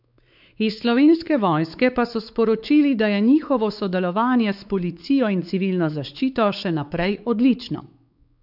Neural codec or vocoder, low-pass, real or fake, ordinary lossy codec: codec, 16 kHz, 4 kbps, X-Codec, WavLM features, trained on Multilingual LibriSpeech; 5.4 kHz; fake; none